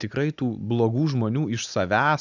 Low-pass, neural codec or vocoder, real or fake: 7.2 kHz; none; real